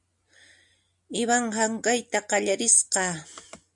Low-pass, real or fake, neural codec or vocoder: 10.8 kHz; real; none